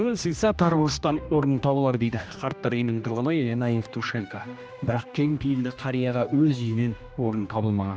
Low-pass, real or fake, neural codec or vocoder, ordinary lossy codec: none; fake; codec, 16 kHz, 1 kbps, X-Codec, HuBERT features, trained on general audio; none